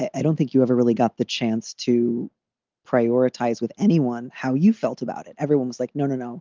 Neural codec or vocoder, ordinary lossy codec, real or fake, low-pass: none; Opus, 24 kbps; real; 7.2 kHz